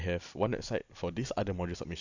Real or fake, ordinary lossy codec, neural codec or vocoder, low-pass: real; none; none; 7.2 kHz